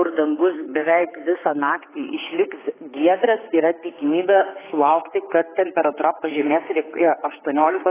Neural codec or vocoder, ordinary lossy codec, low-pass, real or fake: codec, 16 kHz, 4 kbps, X-Codec, HuBERT features, trained on general audio; AAC, 16 kbps; 3.6 kHz; fake